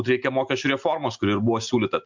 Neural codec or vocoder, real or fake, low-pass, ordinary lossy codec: none; real; 7.2 kHz; MP3, 64 kbps